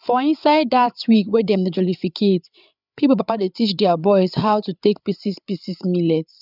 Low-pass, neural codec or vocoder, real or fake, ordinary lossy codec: 5.4 kHz; codec, 16 kHz, 8 kbps, FreqCodec, larger model; fake; none